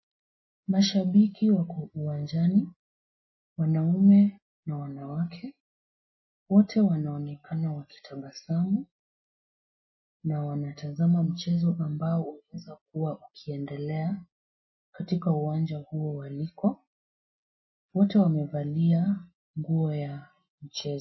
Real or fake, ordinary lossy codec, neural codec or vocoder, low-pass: real; MP3, 24 kbps; none; 7.2 kHz